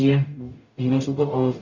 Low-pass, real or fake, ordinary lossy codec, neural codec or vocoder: 7.2 kHz; fake; none; codec, 44.1 kHz, 0.9 kbps, DAC